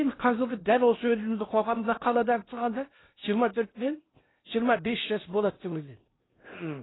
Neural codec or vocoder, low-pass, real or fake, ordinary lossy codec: codec, 16 kHz in and 24 kHz out, 0.6 kbps, FocalCodec, streaming, 4096 codes; 7.2 kHz; fake; AAC, 16 kbps